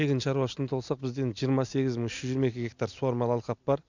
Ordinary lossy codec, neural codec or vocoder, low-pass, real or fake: none; none; 7.2 kHz; real